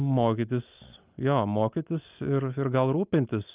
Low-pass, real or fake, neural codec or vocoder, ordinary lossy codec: 3.6 kHz; real; none; Opus, 24 kbps